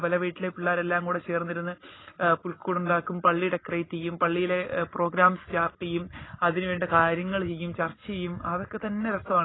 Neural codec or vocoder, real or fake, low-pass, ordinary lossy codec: none; real; 7.2 kHz; AAC, 16 kbps